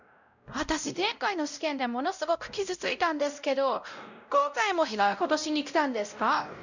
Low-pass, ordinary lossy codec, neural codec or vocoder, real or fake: 7.2 kHz; none; codec, 16 kHz, 0.5 kbps, X-Codec, WavLM features, trained on Multilingual LibriSpeech; fake